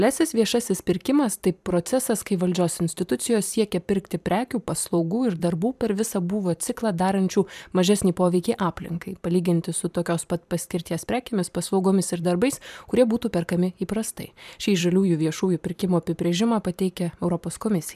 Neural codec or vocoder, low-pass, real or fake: vocoder, 44.1 kHz, 128 mel bands every 512 samples, BigVGAN v2; 14.4 kHz; fake